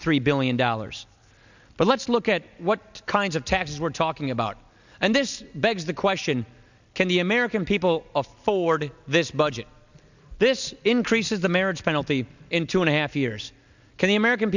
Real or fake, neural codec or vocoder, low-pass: real; none; 7.2 kHz